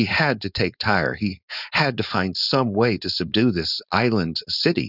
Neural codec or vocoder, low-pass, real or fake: codec, 16 kHz, 4.8 kbps, FACodec; 5.4 kHz; fake